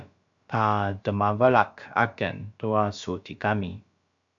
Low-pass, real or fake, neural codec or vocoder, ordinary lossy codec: 7.2 kHz; fake; codec, 16 kHz, about 1 kbps, DyCAST, with the encoder's durations; AAC, 64 kbps